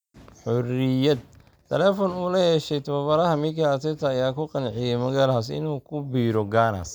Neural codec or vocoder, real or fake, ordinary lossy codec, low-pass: none; real; none; none